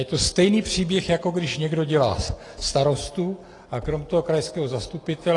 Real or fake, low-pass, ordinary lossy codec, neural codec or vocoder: real; 10.8 kHz; AAC, 32 kbps; none